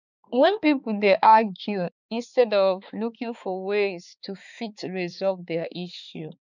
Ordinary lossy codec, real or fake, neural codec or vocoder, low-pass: none; fake; codec, 16 kHz, 4 kbps, X-Codec, HuBERT features, trained on balanced general audio; 7.2 kHz